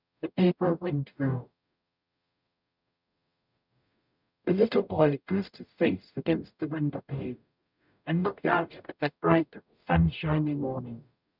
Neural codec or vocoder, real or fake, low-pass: codec, 44.1 kHz, 0.9 kbps, DAC; fake; 5.4 kHz